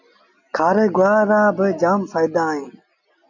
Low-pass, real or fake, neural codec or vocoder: 7.2 kHz; real; none